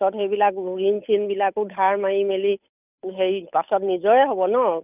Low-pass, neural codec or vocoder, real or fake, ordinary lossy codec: 3.6 kHz; codec, 16 kHz, 8 kbps, FunCodec, trained on Chinese and English, 25 frames a second; fake; none